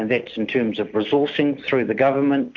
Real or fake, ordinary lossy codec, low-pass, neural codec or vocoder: real; MP3, 48 kbps; 7.2 kHz; none